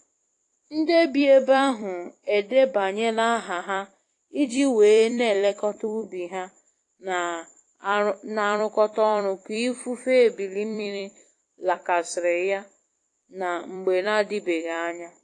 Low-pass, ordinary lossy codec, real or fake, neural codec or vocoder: 10.8 kHz; AAC, 48 kbps; fake; vocoder, 44.1 kHz, 128 mel bands every 256 samples, BigVGAN v2